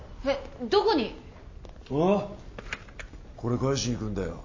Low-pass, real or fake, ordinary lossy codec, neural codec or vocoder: 7.2 kHz; real; MP3, 32 kbps; none